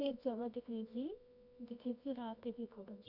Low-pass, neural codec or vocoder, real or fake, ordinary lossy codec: 5.4 kHz; codec, 24 kHz, 0.9 kbps, WavTokenizer, medium music audio release; fake; none